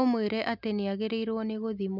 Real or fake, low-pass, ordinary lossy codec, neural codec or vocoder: real; 5.4 kHz; none; none